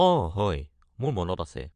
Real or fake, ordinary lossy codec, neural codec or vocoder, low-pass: fake; MP3, 64 kbps; vocoder, 22.05 kHz, 80 mel bands, Vocos; 9.9 kHz